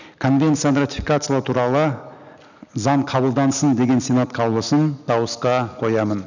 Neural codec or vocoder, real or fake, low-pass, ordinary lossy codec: none; real; 7.2 kHz; none